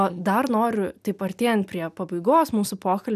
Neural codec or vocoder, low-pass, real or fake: none; 14.4 kHz; real